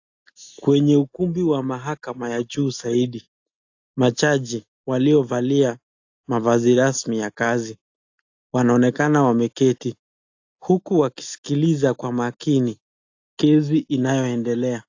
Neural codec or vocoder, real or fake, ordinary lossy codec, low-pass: none; real; AAC, 48 kbps; 7.2 kHz